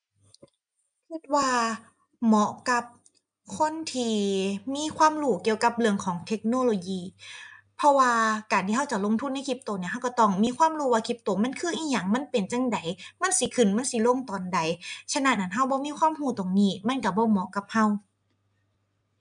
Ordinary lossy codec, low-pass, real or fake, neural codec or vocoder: MP3, 96 kbps; 9.9 kHz; real; none